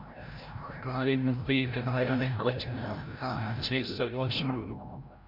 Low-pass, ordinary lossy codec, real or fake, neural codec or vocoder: 5.4 kHz; MP3, 48 kbps; fake; codec, 16 kHz, 0.5 kbps, FreqCodec, larger model